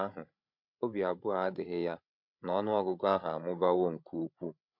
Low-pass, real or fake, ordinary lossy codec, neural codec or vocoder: 5.4 kHz; fake; MP3, 48 kbps; codec, 16 kHz, 16 kbps, FreqCodec, larger model